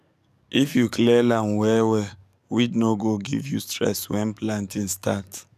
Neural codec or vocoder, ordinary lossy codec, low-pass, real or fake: codec, 44.1 kHz, 7.8 kbps, DAC; none; 14.4 kHz; fake